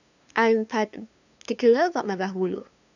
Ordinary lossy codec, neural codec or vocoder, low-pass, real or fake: none; codec, 16 kHz, 2 kbps, FunCodec, trained on LibriTTS, 25 frames a second; 7.2 kHz; fake